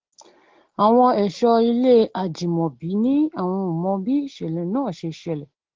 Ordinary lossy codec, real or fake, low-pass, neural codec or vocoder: Opus, 16 kbps; real; 7.2 kHz; none